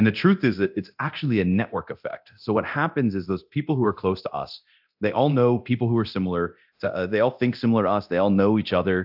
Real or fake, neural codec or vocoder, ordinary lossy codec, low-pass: fake; codec, 24 kHz, 0.9 kbps, DualCodec; AAC, 48 kbps; 5.4 kHz